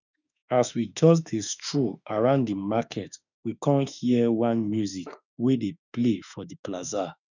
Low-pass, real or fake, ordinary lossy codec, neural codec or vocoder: 7.2 kHz; fake; none; autoencoder, 48 kHz, 32 numbers a frame, DAC-VAE, trained on Japanese speech